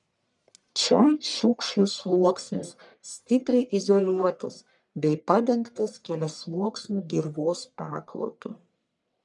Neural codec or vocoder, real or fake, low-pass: codec, 44.1 kHz, 1.7 kbps, Pupu-Codec; fake; 10.8 kHz